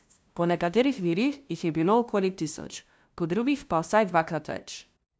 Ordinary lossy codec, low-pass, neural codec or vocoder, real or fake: none; none; codec, 16 kHz, 0.5 kbps, FunCodec, trained on LibriTTS, 25 frames a second; fake